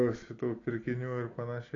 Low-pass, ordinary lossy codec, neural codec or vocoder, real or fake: 7.2 kHz; AAC, 32 kbps; none; real